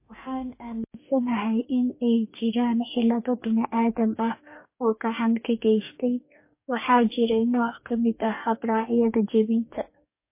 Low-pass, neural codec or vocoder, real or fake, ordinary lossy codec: 3.6 kHz; codec, 44.1 kHz, 2.6 kbps, DAC; fake; MP3, 24 kbps